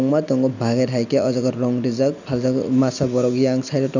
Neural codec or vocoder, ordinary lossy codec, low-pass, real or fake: none; none; 7.2 kHz; real